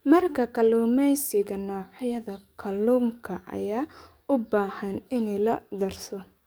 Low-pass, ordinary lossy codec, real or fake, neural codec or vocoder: none; none; fake; codec, 44.1 kHz, 7.8 kbps, DAC